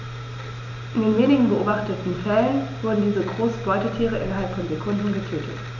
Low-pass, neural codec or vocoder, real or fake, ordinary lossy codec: 7.2 kHz; none; real; none